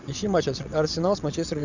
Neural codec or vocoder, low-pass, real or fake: vocoder, 22.05 kHz, 80 mel bands, WaveNeXt; 7.2 kHz; fake